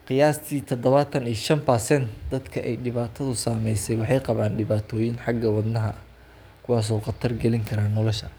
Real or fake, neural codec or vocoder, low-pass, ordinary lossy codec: fake; codec, 44.1 kHz, 7.8 kbps, DAC; none; none